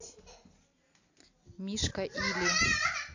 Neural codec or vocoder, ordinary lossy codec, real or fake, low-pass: none; AAC, 48 kbps; real; 7.2 kHz